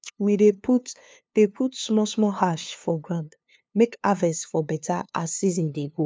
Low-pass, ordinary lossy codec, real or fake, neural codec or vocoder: none; none; fake; codec, 16 kHz, 2 kbps, FunCodec, trained on LibriTTS, 25 frames a second